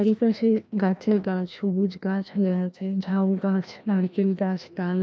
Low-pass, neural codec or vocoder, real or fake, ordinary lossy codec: none; codec, 16 kHz, 1 kbps, FreqCodec, larger model; fake; none